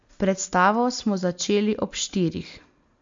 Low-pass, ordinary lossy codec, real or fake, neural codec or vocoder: 7.2 kHz; MP3, 48 kbps; real; none